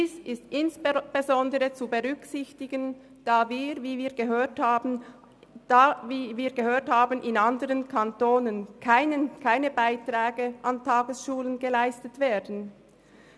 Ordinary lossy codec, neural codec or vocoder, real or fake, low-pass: none; none; real; none